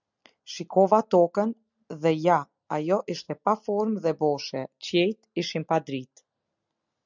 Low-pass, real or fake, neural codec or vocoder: 7.2 kHz; real; none